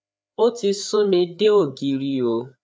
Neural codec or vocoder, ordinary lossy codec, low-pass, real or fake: codec, 16 kHz, 4 kbps, FreqCodec, larger model; none; none; fake